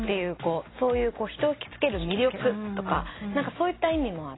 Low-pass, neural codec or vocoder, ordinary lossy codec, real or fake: 7.2 kHz; none; AAC, 16 kbps; real